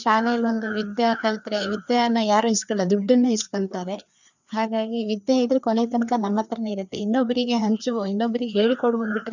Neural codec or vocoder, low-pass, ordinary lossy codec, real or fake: codec, 16 kHz, 2 kbps, FreqCodec, larger model; 7.2 kHz; none; fake